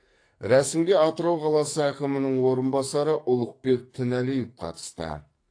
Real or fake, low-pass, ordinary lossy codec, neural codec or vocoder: fake; 9.9 kHz; AAC, 48 kbps; codec, 44.1 kHz, 2.6 kbps, SNAC